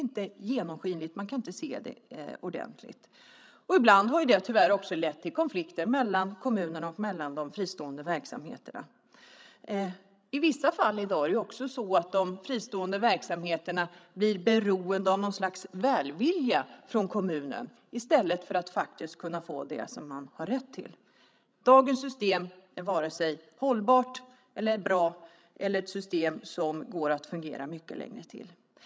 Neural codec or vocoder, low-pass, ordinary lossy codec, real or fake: codec, 16 kHz, 16 kbps, FreqCodec, larger model; none; none; fake